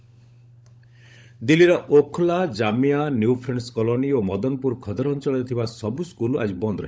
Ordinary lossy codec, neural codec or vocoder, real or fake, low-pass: none; codec, 16 kHz, 16 kbps, FunCodec, trained on LibriTTS, 50 frames a second; fake; none